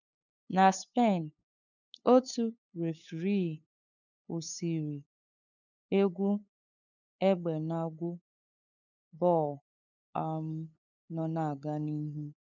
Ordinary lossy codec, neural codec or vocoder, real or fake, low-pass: none; codec, 16 kHz, 8 kbps, FunCodec, trained on LibriTTS, 25 frames a second; fake; 7.2 kHz